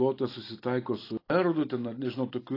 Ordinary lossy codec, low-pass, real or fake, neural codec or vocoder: AAC, 24 kbps; 5.4 kHz; real; none